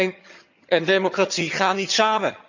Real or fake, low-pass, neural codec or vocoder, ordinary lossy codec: fake; 7.2 kHz; vocoder, 22.05 kHz, 80 mel bands, HiFi-GAN; none